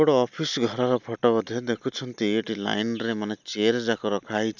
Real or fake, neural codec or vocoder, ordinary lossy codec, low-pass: real; none; none; 7.2 kHz